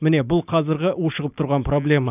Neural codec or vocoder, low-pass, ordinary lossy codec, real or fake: none; 3.6 kHz; none; real